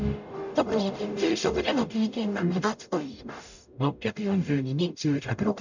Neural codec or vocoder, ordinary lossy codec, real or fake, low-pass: codec, 44.1 kHz, 0.9 kbps, DAC; none; fake; 7.2 kHz